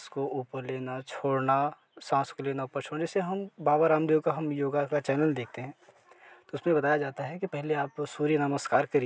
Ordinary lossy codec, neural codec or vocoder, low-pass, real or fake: none; none; none; real